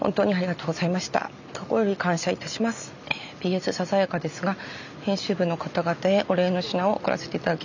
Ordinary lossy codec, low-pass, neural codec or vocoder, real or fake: none; 7.2 kHz; none; real